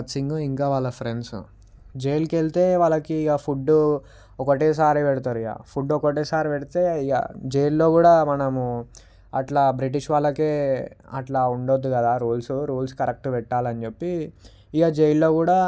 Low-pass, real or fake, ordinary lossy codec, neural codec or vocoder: none; real; none; none